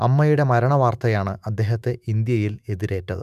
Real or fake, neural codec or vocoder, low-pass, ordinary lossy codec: fake; autoencoder, 48 kHz, 128 numbers a frame, DAC-VAE, trained on Japanese speech; 14.4 kHz; none